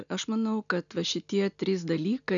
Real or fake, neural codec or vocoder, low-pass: real; none; 7.2 kHz